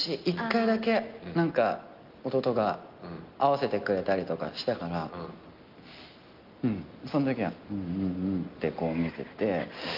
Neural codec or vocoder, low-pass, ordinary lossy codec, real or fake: none; 5.4 kHz; Opus, 24 kbps; real